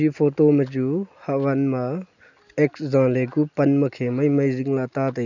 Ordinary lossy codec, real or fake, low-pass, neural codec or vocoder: none; real; 7.2 kHz; none